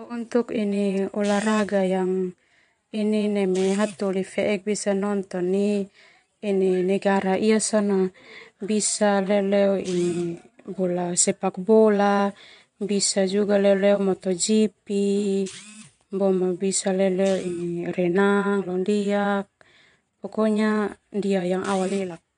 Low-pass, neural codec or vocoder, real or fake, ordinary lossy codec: 9.9 kHz; vocoder, 22.05 kHz, 80 mel bands, Vocos; fake; MP3, 64 kbps